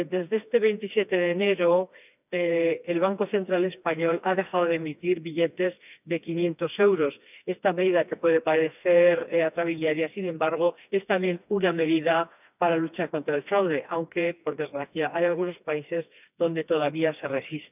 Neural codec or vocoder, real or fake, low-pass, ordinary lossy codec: codec, 16 kHz, 2 kbps, FreqCodec, smaller model; fake; 3.6 kHz; none